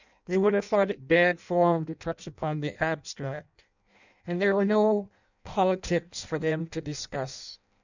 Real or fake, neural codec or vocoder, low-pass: fake; codec, 16 kHz in and 24 kHz out, 0.6 kbps, FireRedTTS-2 codec; 7.2 kHz